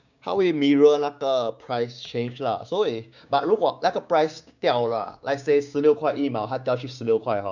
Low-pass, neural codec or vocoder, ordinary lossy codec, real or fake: 7.2 kHz; codec, 16 kHz, 6 kbps, DAC; none; fake